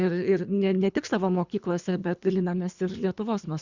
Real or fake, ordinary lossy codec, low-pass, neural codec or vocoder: fake; Opus, 64 kbps; 7.2 kHz; codec, 24 kHz, 3 kbps, HILCodec